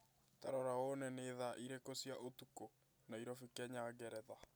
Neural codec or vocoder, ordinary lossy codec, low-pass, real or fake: none; none; none; real